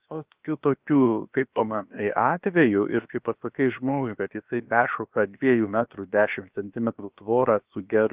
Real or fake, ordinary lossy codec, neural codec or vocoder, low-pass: fake; Opus, 24 kbps; codec, 16 kHz, about 1 kbps, DyCAST, with the encoder's durations; 3.6 kHz